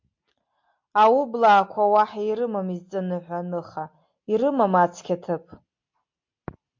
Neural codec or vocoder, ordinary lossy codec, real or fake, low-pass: none; MP3, 48 kbps; real; 7.2 kHz